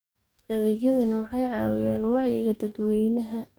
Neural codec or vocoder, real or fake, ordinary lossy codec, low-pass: codec, 44.1 kHz, 2.6 kbps, DAC; fake; none; none